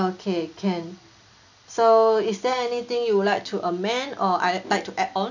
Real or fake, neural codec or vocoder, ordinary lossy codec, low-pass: real; none; none; 7.2 kHz